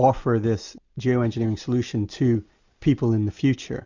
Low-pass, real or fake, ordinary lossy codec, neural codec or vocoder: 7.2 kHz; real; Opus, 64 kbps; none